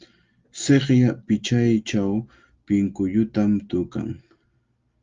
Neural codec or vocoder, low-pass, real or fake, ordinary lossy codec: none; 7.2 kHz; real; Opus, 32 kbps